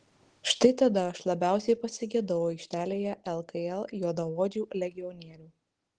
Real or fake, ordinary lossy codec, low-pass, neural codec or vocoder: real; Opus, 16 kbps; 9.9 kHz; none